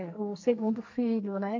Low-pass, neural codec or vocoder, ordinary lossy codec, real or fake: 7.2 kHz; codec, 44.1 kHz, 2.6 kbps, SNAC; MP3, 48 kbps; fake